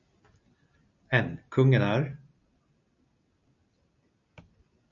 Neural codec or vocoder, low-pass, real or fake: none; 7.2 kHz; real